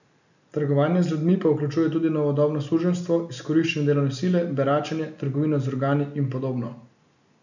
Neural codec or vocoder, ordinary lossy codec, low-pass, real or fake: none; none; 7.2 kHz; real